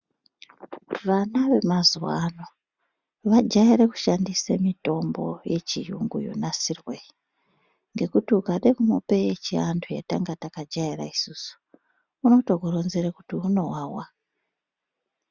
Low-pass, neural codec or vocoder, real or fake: 7.2 kHz; none; real